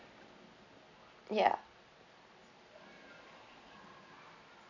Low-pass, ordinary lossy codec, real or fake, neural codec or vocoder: 7.2 kHz; none; real; none